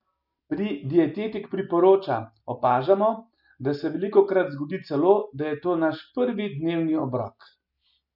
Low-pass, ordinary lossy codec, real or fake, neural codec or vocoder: 5.4 kHz; none; real; none